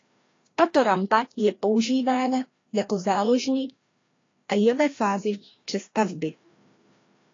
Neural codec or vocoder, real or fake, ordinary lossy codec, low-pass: codec, 16 kHz, 1 kbps, FreqCodec, larger model; fake; AAC, 32 kbps; 7.2 kHz